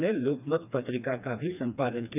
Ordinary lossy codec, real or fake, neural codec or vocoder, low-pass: none; fake; codec, 16 kHz, 2 kbps, FreqCodec, smaller model; 3.6 kHz